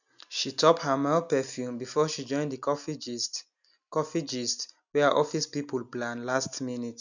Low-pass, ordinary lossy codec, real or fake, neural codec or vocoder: 7.2 kHz; none; real; none